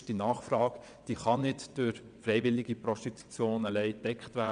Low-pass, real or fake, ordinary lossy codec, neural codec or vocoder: 9.9 kHz; fake; none; vocoder, 22.05 kHz, 80 mel bands, WaveNeXt